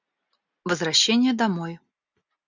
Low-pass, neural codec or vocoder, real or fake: 7.2 kHz; none; real